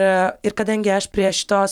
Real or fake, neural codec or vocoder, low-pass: fake; vocoder, 44.1 kHz, 128 mel bands every 512 samples, BigVGAN v2; 19.8 kHz